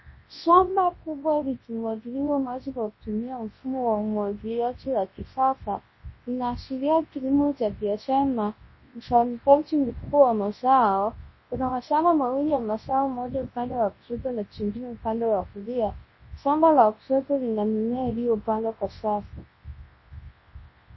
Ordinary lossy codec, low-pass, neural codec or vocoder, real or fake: MP3, 24 kbps; 7.2 kHz; codec, 24 kHz, 0.9 kbps, WavTokenizer, large speech release; fake